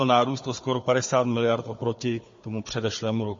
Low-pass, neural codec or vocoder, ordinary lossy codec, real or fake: 7.2 kHz; codec, 16 kHz, 4 kbps, FunCodec, trained on Chinese and English, 50 frames a second; MP3, 32 kbps; fake